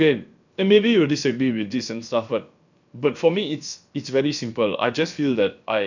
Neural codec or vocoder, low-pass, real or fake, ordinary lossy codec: codec, 16 kHz, about 1 kbps, DyCAST, with the encoder's durations; 7.2 kHz; fake; none